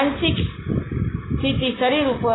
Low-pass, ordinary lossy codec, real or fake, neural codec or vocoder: 7.2 kHz; AAC, 16 kbps; real; none